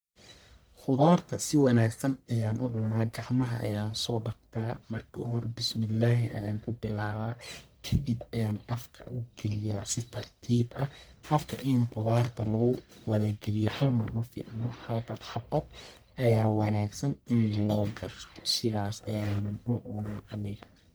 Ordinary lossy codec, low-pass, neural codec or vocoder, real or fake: none; none; codec, 44.1 kHz, 1.7 kbps, Pupu-Codec; fake